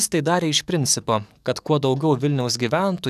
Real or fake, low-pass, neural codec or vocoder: fake; 14.4 kHz; codec, 44.1 kHz, 7.8 kbps, DAC